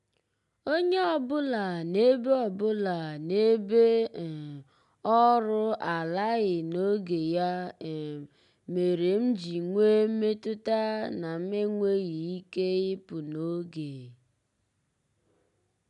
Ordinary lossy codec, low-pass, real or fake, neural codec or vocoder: MP3, 96 kbps; 10.8 kHz; real; none